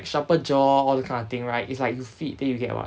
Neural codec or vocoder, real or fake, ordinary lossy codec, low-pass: none; real; none; none